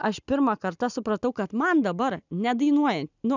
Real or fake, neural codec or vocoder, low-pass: real; none; 7.2 kHz